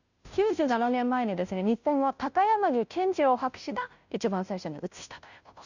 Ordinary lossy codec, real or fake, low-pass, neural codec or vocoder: none; fake; 7.2 kHz; codec, 16 kHz, 0.5 kbps, FunCodec, trained on Chinese and English, 25 frames a second